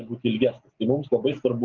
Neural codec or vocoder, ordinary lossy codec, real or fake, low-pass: none; Opus, 32 kbps; real; 7.2 kHz